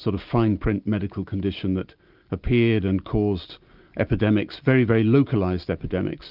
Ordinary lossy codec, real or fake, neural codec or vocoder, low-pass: Opus, 24 kbps; real; none; 5.4 kHz